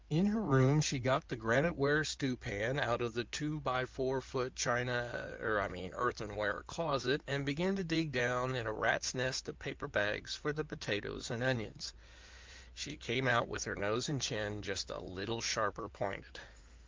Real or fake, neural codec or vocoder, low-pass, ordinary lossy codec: fake; codec, 16 kHz in and 24 kHz out, 2.2 kbps, FireRedTTS-2 codec; 7.2 kHz; Opus, 24 kbps